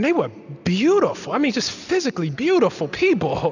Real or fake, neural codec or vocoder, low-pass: real; none; 7.2 kHz